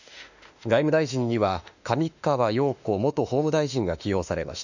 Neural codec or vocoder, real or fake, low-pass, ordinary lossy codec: autoencoder, 48 kHz, 32 numbers a frame, DAC-VAE, trained on Japanese speech; fake; 7.2 kHz; none